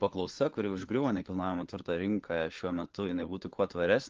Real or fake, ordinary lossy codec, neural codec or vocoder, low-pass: fake; Opus, 16 kbps; codec, 16 kHz, 4 kbps, FunCodec, trained on LibriTTS, 50 frames a second; 7.2 kHz